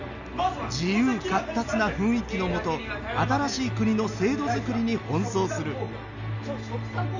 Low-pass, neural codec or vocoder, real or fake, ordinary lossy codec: 7.2 kHz; none; real; none